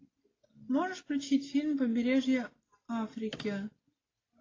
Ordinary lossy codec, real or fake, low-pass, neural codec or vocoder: AAC, 32 kbps; real; 7.2 kHz; none